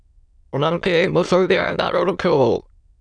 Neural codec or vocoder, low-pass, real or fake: autoencoder, 22.05 kHz, a latent of 192 numbers a frame, VITS, trained on many speakers; 9.9 kHz; fake